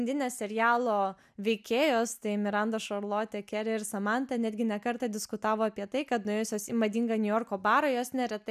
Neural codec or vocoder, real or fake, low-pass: none; real; 14.4 kHz